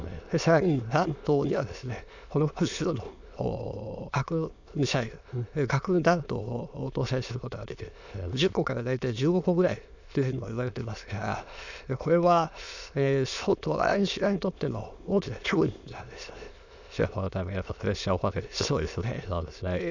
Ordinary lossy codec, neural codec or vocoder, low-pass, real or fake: none; autoencoder, 22.05 kHz, a latent of 192 numbers a frame, VITS, trained on many speakers; 7.2 kHz; fake